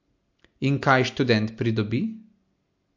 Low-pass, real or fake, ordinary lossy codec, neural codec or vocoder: 7.2 kHz; real; MP3, 48 kbps; none